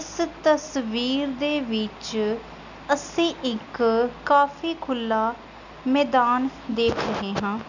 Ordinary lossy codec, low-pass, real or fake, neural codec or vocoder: none; 7.2 kHz; real; none